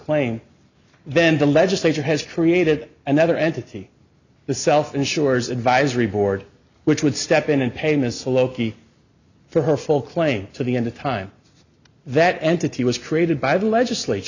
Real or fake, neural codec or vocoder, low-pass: real; none; 7.2 kHz